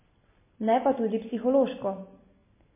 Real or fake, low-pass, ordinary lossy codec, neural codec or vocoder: real; 3.6 kHz; MP3, 16 kbps; none